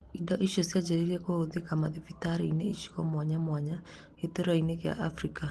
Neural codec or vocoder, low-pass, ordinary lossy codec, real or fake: none; 10.8 kHz; Opus, 16 kbps; real